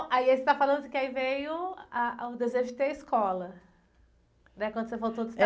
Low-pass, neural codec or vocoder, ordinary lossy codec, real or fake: none; none; none; real